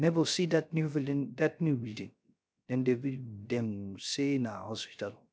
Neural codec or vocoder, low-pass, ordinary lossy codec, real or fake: codec, 16 kHz, 0.3 kbps, FocalCodec; none; none; fake